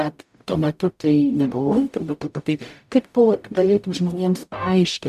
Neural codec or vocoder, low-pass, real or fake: codec, 44.1 kHz, 0.9 kbps, DAC; 14.4 kHz; fake